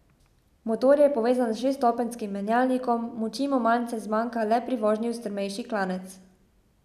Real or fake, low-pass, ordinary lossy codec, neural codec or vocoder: real; 14.4 kHz; none; none